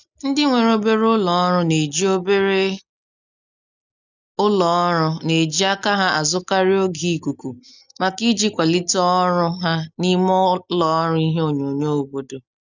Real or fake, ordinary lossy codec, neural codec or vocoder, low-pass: real; none; none; 7.2 kHz